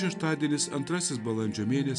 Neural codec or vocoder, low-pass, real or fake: none; 10.8 kHz; real